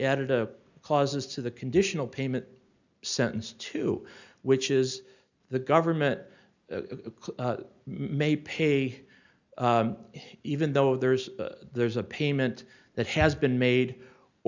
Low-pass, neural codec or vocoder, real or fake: 7.2 kHz; none; real